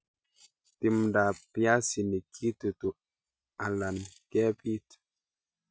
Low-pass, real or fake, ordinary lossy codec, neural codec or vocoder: none; real; none; none